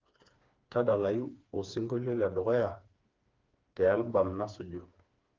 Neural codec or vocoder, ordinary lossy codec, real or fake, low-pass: codec, 16 kHz, 4 kbps, FreqCodec, smaller model; Opus, 32 kbps; fake; 7.2 kHz